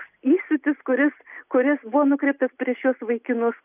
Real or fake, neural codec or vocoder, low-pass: real; none; 3.6 kHz